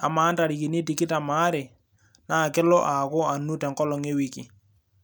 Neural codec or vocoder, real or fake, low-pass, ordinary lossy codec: none; real; none; none